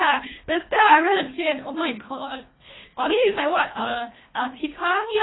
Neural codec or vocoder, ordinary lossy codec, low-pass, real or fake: codec, 24 kHz, 1.5 kbps, HILCodec; AAC, 16 kbps; 7.2 kHz; fake